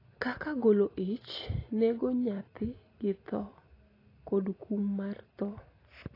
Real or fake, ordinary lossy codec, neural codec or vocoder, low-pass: real; AAC, 24 kbps; none; 5.4 kHz